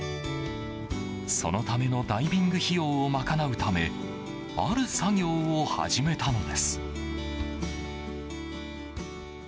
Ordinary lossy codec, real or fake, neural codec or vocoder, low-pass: none; real; none; none